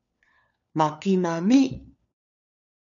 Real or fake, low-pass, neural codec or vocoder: fake; 7.2 kHz; codec, 16 kHz, 4 kbps, FunCodec, trained on LibriTTS, 50 frames a second